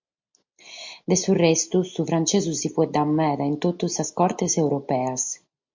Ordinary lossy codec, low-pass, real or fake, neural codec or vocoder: MP3, 48 kbps; 7.2 kHz; real; none